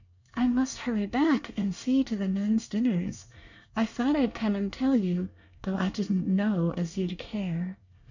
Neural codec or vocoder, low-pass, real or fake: codec, 24 kHz, 1 kbps, SNAC; 7.2 kHz; fake